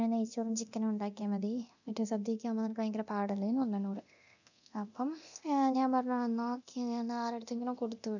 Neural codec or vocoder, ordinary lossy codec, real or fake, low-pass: codec, 24 kHz, 0.9 kbps, DualCodec; none; fake; 7.2 kHz